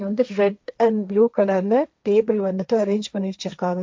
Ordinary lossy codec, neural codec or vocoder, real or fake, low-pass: none; codec, 16 kHz, 1.1 kbps, Voila-Tokenizer; fake; none